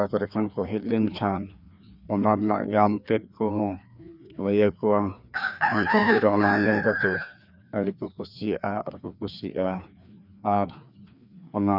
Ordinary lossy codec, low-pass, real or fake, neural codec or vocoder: none; 5.4 kHz; fake; codec, 16 kHz, 2 kbps, FreqCodec, larger model